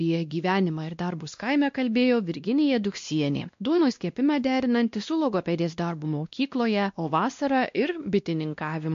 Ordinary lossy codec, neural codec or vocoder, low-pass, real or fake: MP3, 48 kbps; codec, 16 kHz, 1 kbps, X-Codec, WavLM features, trained on Multilingual LibriSpeech; 7.2 kHz; fake